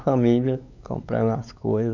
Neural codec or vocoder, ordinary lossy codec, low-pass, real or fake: codec, 16 kHz, 4 kbps, X-Codec, WavLM features, trained on Multilingual LibriSpeech; none; 7.2 kHz; fake